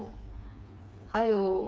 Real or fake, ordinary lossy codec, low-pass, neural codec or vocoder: fake; none; none; codec, 16 kHz, 4 kbps, FreqCodec, smaller model